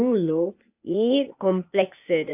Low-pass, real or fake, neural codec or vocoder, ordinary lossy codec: 3.6 kHz; fake; codec, 16 kHz, 0.8 kbps, ZipCodec; AAC, 32 kbps